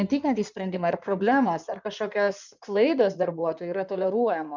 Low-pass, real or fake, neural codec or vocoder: 7.2 kHz; fake; codec, 16 kHz in and 24 kHz out, 2.2 kbps, FireRedTTS-2 codec